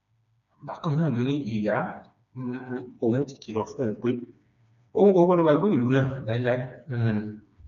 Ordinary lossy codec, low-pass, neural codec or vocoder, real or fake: none; 7.2 kHz; codec, 16 kHz, 2 kbps, FreqCodec, smaller model; fake